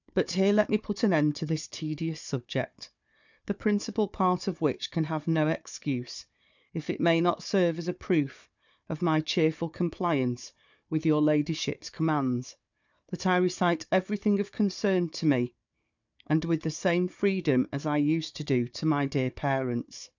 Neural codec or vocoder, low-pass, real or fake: codec, 16 kHz, 4 kbps, FunCodec, trained on Chinese and English, 50 frames a second; 7.2 kHz; fake